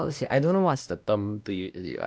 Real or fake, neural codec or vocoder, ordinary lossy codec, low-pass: fake; codec, 16 kHz, 1 kbps, X-Codec, WavLM features, trained on Multilingual LibriSpeech; none; none